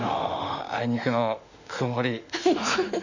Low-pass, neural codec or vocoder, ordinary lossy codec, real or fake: 7.2 kHz; autoencoder, 48 kHz, 32 numbers a frame, DAC-VAE, trained on Japanese speech; none; fake